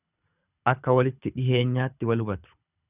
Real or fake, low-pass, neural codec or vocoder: fake; 3.6 kHz; codec, 24 kHz, 6 kbps, HILCodec